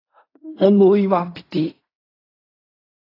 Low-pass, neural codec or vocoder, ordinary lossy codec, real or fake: 5.4 kHz; codec, 16 kHz in and 24 kHz out, 0.4 kbps, LongCat-Audio-Codec, fine tuned four codebook decoder; AAC, 24 kbps; fake